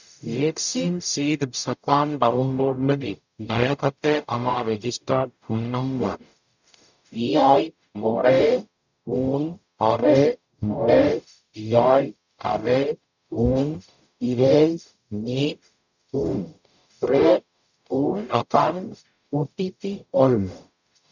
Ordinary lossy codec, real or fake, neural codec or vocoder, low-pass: none; fake; codec, 44.1 kHz, 0.9 kbps, DAC; 7.2 kHz